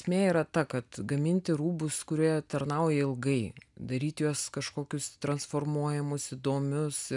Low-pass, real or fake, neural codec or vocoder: 10.8 kHz; real; none